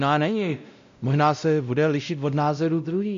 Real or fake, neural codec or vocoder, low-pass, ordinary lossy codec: fake; codec, 16 kHz, 0.5 kbps, X-Codec, WavLM features, trained on Multilingual LibriSpeech; 7.2 kHz; MP3, 64 kbps